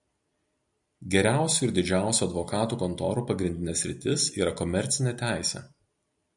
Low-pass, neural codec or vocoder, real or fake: 10.8 kHz; none; real